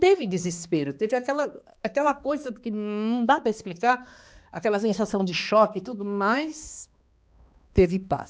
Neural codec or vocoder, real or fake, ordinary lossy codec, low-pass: codec, 16 kHz, 2 kbps, X-Codec, HuBERT features, trained on balanced general audio; fake; none; none